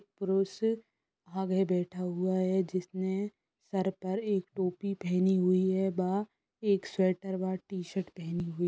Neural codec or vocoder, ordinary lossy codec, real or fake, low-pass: none; none; real; none